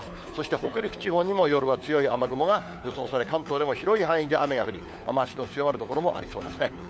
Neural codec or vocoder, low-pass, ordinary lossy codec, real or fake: codec, 16 kHz, 4 kbps, FunCodec, trained on LibriTTS, 50 frames a second; none; none; fake